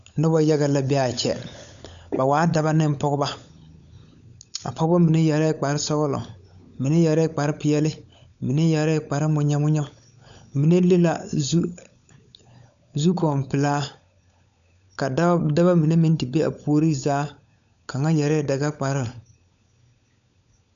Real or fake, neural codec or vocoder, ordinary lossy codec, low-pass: fake; codec, 16 kHz, 16 kbps, FunCodec, trained on LibriTTS, 50 frames a second; MP3, 96 kbps; 7.2 kHz